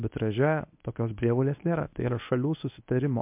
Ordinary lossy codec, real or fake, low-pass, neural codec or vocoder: MP3, 32 kbps; fake; 3.6 kHz; codec, 24 kHz, 0.9 kbps, WavTokenizer, medium speech release version 1